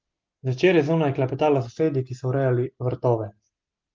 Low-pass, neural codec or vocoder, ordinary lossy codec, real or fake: 7.2 kHz; none; Opus, 32 kbps; real